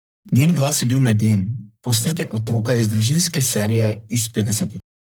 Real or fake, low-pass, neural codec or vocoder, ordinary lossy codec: fake; none; codec, 44.1 kHz, 1.7 kbps, Pupu-Codec; none